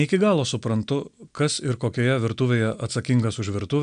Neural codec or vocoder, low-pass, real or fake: none; 9.9 kHz; real